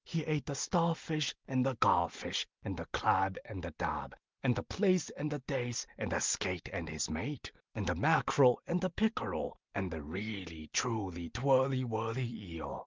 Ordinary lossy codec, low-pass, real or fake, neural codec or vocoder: Opus, 32 kbps; 7.2 kHz; real; none